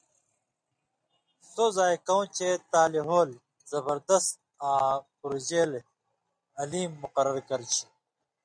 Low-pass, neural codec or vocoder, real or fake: 9.9 kHz; none; real